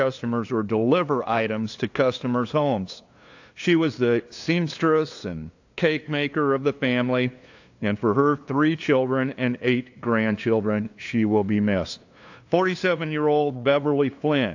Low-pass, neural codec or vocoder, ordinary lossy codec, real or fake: 7.2 kHz; codec, 16 kHz, 2 kbps, FunCodec, trained on LibriTTS, 25 frames a second; AAC, 48 kbps; fake